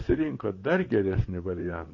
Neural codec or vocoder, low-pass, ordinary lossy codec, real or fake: vocoder, 44.1 kHz, 128 mel bands, Pupu-Vocoder; 7.2 kHz; MP3, 32 kbps; fake